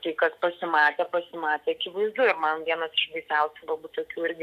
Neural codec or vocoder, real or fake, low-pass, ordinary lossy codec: autoencoder, 48 kHz, 128 numbers a frame, DAC-VAE, trained on Japanese speech; fake; 14.4 kHz; MP3, 96 kbps